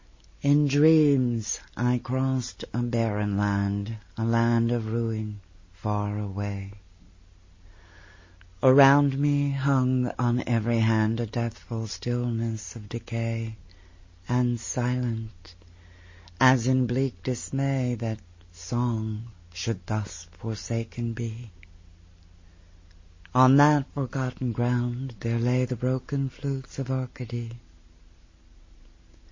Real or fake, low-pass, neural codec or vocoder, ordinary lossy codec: real; 7.2 kHz; none; MP3, 32 kbps